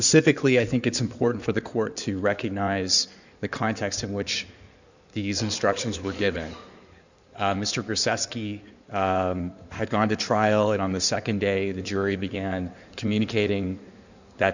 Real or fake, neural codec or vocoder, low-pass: fake; codec, 16 kHz in and 24 kHz out, 2.2 kbps, FireRedTTS-2 codec; 7.2 kHz